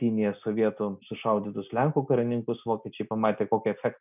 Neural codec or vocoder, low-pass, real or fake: none; 3.6 kHz; real